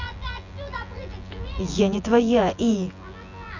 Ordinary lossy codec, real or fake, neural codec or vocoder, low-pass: none; fake; vocoder, 24 kHz, 100 mel bands, Vocos; 7.2 kHz